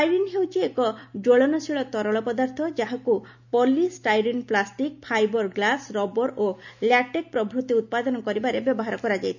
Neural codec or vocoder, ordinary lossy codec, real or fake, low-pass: none; none; real; 7.2 kHz